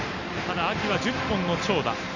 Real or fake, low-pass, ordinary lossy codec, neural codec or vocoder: real; 7.2 kHz; none; none